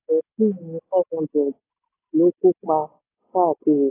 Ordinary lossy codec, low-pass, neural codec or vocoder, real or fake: AAC, 16 kbps; 3.6 kHz; none; real